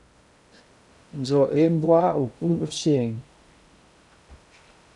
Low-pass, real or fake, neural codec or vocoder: 10.8 kHz; fake; codec, 16 kHz in and 24 kHz out, 0.6 kbps, FocalCodec, streaming, 2048 codes